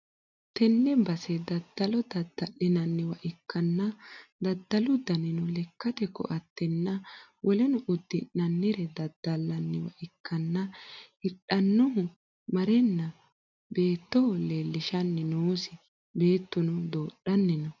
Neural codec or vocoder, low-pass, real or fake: none; 7.2 kHz; real